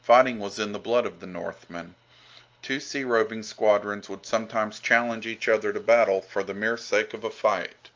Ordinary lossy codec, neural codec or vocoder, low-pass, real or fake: Opus, 24 kbps; none; 7.2 kHz; real